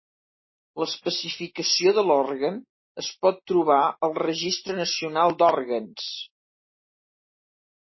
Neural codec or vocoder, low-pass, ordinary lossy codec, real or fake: none; 7.2 kHz; MP3, 24 kbps; real